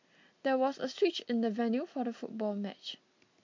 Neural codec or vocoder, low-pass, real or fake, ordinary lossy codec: none; 7.2 kHz; real; MP3, 48 kbps